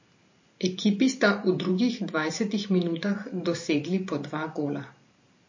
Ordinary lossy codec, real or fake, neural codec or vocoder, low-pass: MP3, 32 kbps; fake; vocoder, 44.1 kHz, 128 mel bands every 512 samples, BigVGAN v2; 7.2 kHz